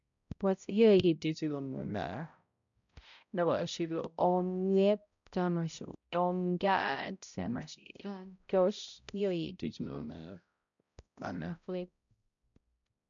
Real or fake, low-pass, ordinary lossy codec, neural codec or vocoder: fake; 7.2 kHz; none; codec, 16 kHz, 0.5 kbps, X-Codec, HuBERT features, trained on balanced general audio